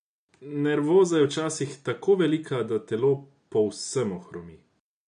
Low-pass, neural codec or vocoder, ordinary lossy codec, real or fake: 9.9 kHz; none; none; real